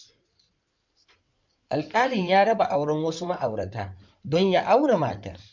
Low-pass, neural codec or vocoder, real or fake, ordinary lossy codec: 7.2 kHz; codec, 16 kHz in and 24 kHz out, 2.2 kbps, FireRedTTS-2 codec; fake; MP3, 64 kbps